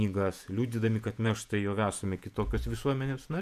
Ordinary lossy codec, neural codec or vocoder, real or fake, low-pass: MP3, 96 kbps; vocoder, 48 kHz, 128 mel bands, Vocos; fake; 14.4 kHz